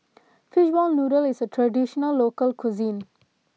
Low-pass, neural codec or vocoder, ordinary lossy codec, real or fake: none; none; none; real